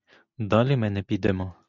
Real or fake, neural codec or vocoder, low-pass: real; none; 7.2 kHz